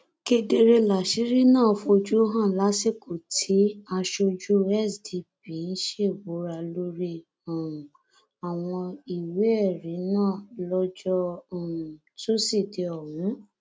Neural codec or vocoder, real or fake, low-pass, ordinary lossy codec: none; real; none; none